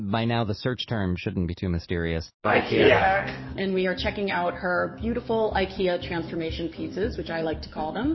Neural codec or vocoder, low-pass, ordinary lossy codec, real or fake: codec, 44.1 kHz, 7.8 kbps, DAC; 7.2 kHz; MP3, 24 kbps; fake